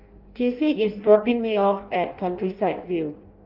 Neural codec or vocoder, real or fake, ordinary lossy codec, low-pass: codec, 16 kHz in and 24 kHz out, 0.6 kbps, FireRedTTS-2 codec; fake; Opus, 32 kbps; 5.4 kHz